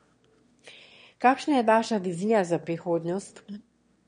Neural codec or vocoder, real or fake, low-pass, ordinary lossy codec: autoencoder, 22.05 kHz, a latent of 192 numbers a frame, VITS, trained on one speaker; fake; 9.9 kHz; MP3, 48 kbps